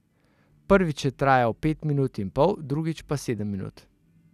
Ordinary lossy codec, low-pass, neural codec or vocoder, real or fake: none; 14.4 kHz; none; real